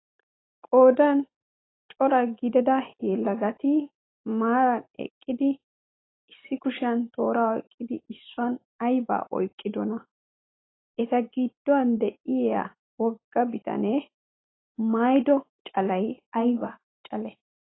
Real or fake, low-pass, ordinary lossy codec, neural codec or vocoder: real; 7.2 kHz; AAC, 16 kbps; none